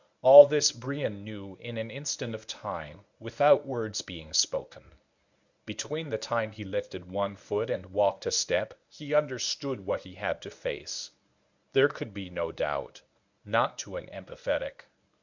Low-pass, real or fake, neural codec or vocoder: 7.2 kHz; fake; codec, 24 kHz, 0.9 kbps, WavTokenizer, medium speech release version 1